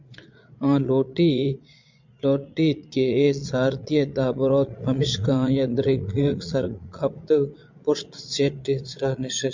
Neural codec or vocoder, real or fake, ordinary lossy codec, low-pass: vocoder, 22.05 kHz, 80 mel bands, Vocos; fake; MP3, 64 kbps; 7.2 kHz